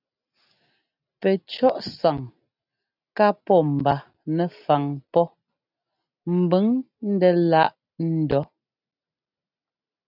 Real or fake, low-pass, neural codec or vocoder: real; 5.4 kHz; none